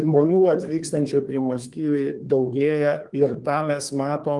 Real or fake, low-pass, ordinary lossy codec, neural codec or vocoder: fake; 10.8 kHz; Opus, 24 kbps; codec, 24 kHz, 1 kbps, SNAC